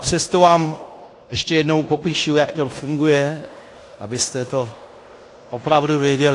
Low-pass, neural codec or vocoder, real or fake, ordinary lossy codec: 10.8 kHz; codec, 16 kHz in and 24 kHz out, 0.9 kbps, LongCat-Audio-Codec, fine tuned four codebook decoder; fake; AAC, 48 kbps